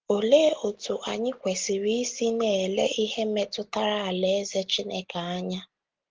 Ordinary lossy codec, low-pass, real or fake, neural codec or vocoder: Opus, 16 kbps; 7.2 kHz; real; none